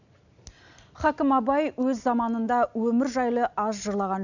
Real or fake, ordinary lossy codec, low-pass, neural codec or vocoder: real; none; 7.2 kHz; none